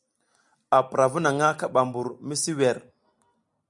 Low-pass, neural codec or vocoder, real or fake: 10.8 kHz; none; real